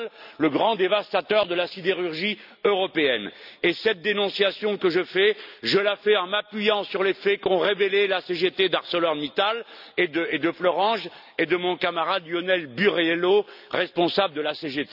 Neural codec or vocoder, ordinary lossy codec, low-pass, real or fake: none; none; 5.4 kHz; real